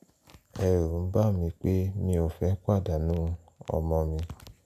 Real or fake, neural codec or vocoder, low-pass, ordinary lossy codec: real; none; 14.4 kHz; none